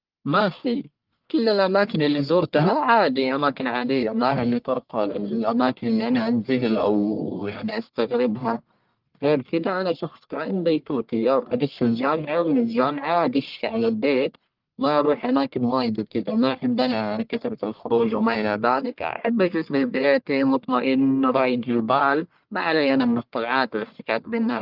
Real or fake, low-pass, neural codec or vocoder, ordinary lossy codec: fake; 5.4 kHz; codec, 44.1 kHz, 1.7 kbps, Pupu-Codec; Opus, 32 kbps